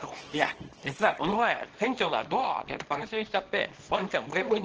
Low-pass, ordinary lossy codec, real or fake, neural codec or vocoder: 7.2 kHz; Opus, 16 kbps; fake; codec, 24 kHz, 0.9 kbps, WavTokenizer, small release